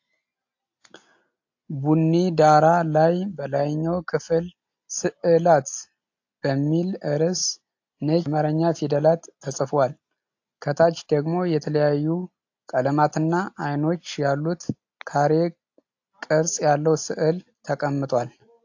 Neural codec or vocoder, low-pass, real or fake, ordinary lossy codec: none; 7.2 kHz; real; AAC, 48 kbps